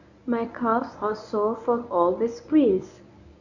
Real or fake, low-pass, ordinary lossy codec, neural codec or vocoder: fake; 7.2 kHz; none; codec, 24 kHz, 0.9 kbps, WavTokenizer, medium speech release version 1